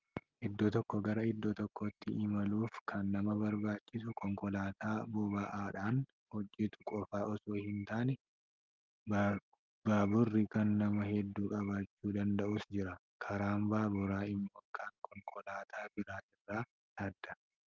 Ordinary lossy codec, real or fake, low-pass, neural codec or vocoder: Opus, 24 kbps; real; 7.2 kHz; none